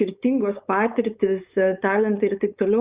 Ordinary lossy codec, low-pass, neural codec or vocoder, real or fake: Opus, 64 kbps; 3.6 kHz; codec, 16 kHz, 4.8 kbps, FACodec; fake